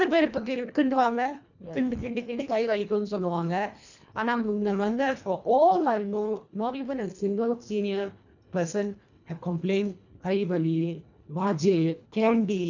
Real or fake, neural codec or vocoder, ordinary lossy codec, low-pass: fake; codec, 24 kHz, 1.5 kbps, HILCodec; none; 7.2 kHz